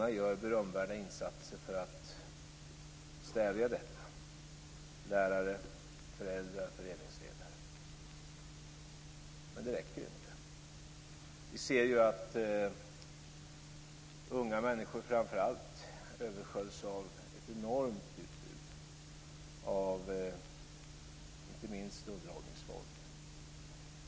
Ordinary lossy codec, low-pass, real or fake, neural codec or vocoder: none; none; real; none